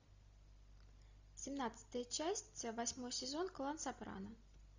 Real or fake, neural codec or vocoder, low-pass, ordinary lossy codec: real; none; 7.2 kHz; Opus, 64 kbps